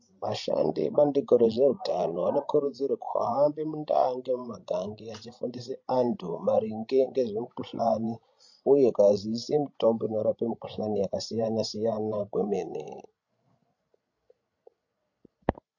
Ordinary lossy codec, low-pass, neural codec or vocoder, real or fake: MP3, 48 kbps; 7.2 kHz; codec, 16 kHz, 16 kbps, FreqCodec, larger model; fake